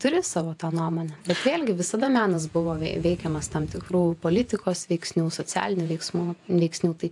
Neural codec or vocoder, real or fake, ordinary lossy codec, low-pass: vocoder, 48 kHz, 128 mel bands, Vocos; fake; AAC, 64 kbps; 10.8 kHz